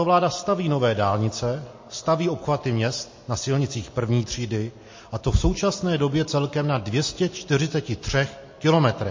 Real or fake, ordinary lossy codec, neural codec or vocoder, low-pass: real; MP3, 32 kbps; none; 7.2 kHz